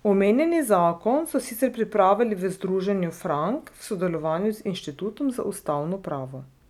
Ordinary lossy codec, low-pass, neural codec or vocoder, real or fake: none; 19.8 kHz; none; real